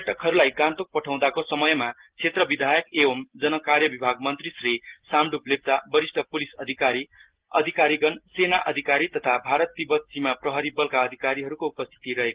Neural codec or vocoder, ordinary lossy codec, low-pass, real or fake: none; Opus, 16 kbps; 3.6 kHz; real